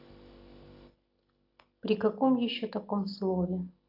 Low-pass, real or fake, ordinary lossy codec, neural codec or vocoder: 5.4 kHz; real; AAC, 48 kbps; none